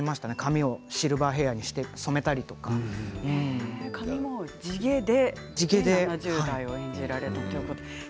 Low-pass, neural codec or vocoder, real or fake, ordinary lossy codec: none; none; real; none